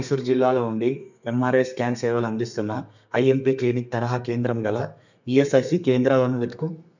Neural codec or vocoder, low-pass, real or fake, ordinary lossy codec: codec, 32 kHz, 1.9 kbps, SNAC; 7.2 kHz; fake; none